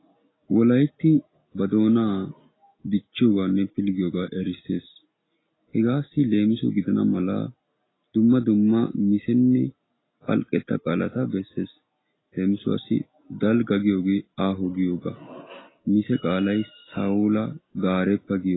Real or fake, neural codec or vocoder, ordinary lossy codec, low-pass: real; none; AAC, 16 kbps; 7.2 kHz